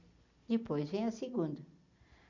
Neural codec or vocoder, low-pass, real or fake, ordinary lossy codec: none; 7.2 kHz; real; none